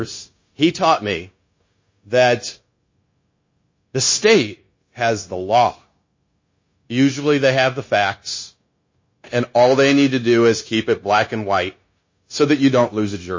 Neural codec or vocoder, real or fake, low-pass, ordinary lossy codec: codec, 16 kHz, 0.9 kbps, LongCat-Audio-Codec; fake; 7.2 kHz; MP3, 32 kbps